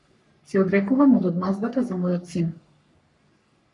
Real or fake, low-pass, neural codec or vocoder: fake; 10.8 kHz; codec, 44.1 kHz, 3.4 kbps, Pupu-Codec